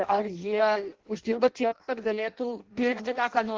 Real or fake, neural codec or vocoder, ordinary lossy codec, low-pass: fake; codec, 16 kHz in and 24 kHz out, 0.6 kbps, FireRedTTS-2 codec; Opus, 16 kbps; 7.2 kHz